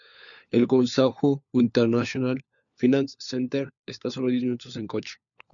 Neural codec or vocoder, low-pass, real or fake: codec, 16 kHz, 4 kbps, FunCodec, trained on LibriTTS, 50 frames a second; 7.2 kHz; fake